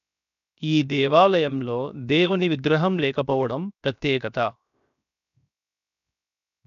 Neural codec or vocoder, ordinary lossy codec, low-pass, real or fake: codec, 16 kHz, 0.7 kbps, FocalCodec; none; 7.2 kHz; fake